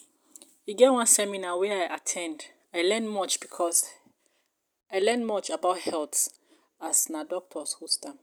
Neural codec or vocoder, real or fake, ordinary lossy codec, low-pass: none; real; none; none